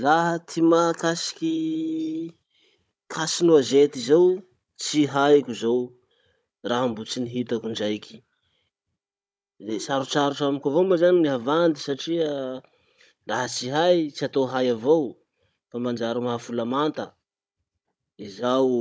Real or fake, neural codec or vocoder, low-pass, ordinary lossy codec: fake; codec, 16 kHz, 16 kbps, FunCodec, trained on Chinese and English, 50 frames a second; none; none